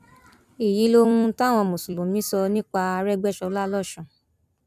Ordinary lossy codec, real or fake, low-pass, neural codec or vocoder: none; fake; 14.4 kHz; vocoder, 44.1 kHz, 128 mel bands every 256 samples, BigVGAN v2